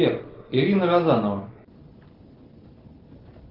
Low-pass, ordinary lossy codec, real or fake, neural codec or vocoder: 5.4 kHz; Opus, 16 kbps; real; none